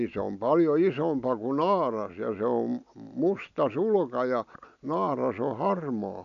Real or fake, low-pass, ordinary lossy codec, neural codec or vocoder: real; 7.2 kHz; none; none